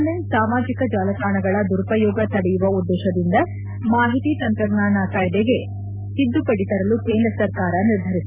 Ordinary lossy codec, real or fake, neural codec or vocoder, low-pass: Opus, 64 kbps; real; none; 3.6 kHz